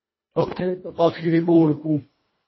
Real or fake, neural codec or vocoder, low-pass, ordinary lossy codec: fake; codec, 24 kHz, 1.5 kbps, HILCodec; 7.2 kHz; MP3, 24 kbps